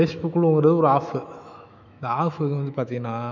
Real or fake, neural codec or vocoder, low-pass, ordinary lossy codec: fake; autoencoder, 48 kHz, 128 numbers a frame, DAC-VAE, trained on Japanese speech; 7.2 kHz; none